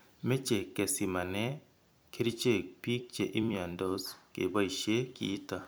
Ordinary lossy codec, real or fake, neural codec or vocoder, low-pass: none; fake; vocoder, 44.1 kHz, 128 mel bands every 256 samples, BigVGAN v2; none